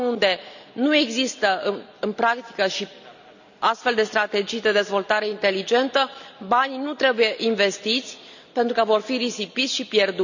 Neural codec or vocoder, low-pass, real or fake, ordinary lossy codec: none; 7.2 kHz; real; none